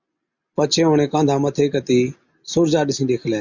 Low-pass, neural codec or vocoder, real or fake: 7.2 kHz; none; real